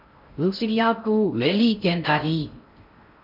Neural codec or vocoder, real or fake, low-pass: codec, 16 kHz in and 24 kHz out, 0.6 kbps, FocalCodec, streaming, 2048 codes; fake; 5.4 kHz